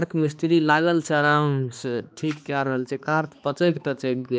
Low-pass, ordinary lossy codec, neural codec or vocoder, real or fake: none; none; codec, 16 kHz, 2 kbps, X-Codec, HuBERT features, trained on balanced general audio; fake